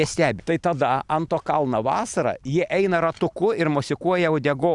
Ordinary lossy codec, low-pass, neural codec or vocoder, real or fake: Opus, 64 kbps; 10.8 kHz; none; real